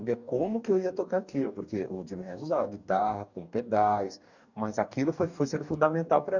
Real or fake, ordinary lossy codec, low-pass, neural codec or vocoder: fake; none; 7.2 kHz; codec, 44.1 kHz, 2.6 kbps, DAC